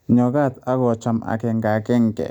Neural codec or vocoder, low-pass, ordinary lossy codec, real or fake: none; 19.8 kHz; none; real